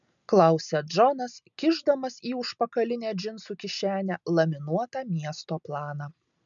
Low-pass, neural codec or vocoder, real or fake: 7.2 kHz; none; real